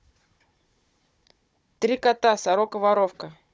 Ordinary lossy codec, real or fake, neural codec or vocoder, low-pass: none; fake; codec, 16 kHz, 16 kbps, FunCodec, trained on Chinese and English, 50 frames a second; none